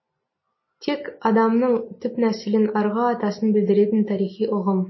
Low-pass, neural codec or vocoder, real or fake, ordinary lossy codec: 7.2 kHz; none; real; MP3, 24 kbps